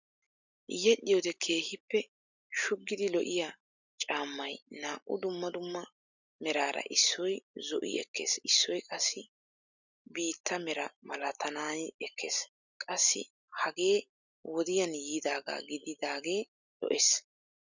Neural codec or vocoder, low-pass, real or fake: none; 7.2 kHz; real